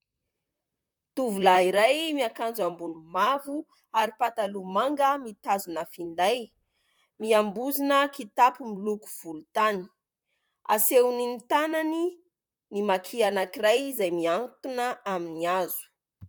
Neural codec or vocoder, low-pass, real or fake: vocoder, 44.1 kHz, 128 mel bands, Pupu-Vocoder; 19.8 kHz; fake